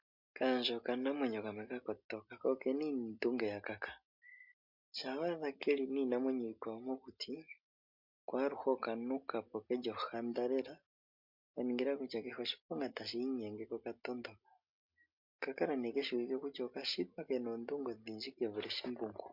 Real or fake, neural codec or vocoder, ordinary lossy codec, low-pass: real; none; MP3, 48 kbps; 5.4 kHz